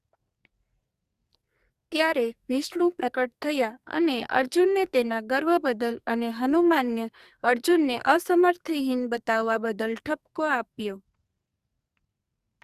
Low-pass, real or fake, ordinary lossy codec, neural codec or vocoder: 14.4 kHz; fake; Opus, 64 kbps; codec, 44.1 kHz, 2.6 kbps, SNAC